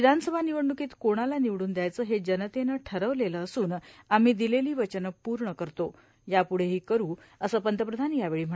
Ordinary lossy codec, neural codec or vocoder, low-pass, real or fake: none; none; none; real